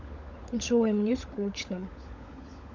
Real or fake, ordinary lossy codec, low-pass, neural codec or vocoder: fake; none; 7.2 kHz; codec, 16 kHz, 16 kbps, FunCodec, trained on LibriTTS, 50 frames a second